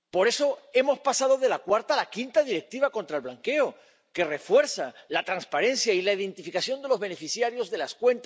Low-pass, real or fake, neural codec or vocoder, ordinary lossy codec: none; real; none; none